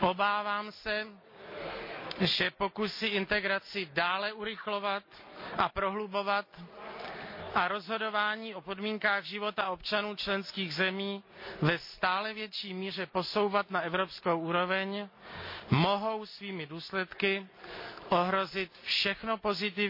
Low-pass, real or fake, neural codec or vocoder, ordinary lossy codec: 5.4 kHz; real; none; MP3, 32 kbps